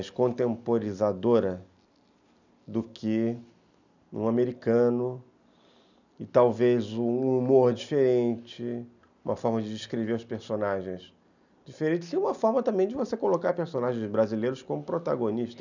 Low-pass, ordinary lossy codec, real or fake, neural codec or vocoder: 7.2 kHz; none; real; none